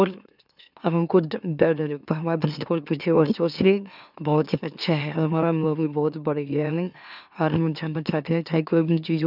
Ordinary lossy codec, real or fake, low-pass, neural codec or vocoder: none; fake; 5.4 kHz; autoencoder, 44.1 kHz, a latent of 192 numbers a frame, MeloTTS